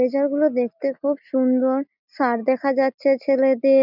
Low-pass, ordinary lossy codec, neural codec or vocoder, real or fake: 5.4 kHz; none; none; real